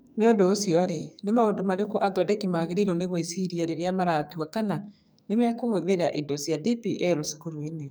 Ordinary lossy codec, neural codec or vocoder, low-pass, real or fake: none; codec, 44.1 kHz, 2.6 kbps, SNAC; none; fake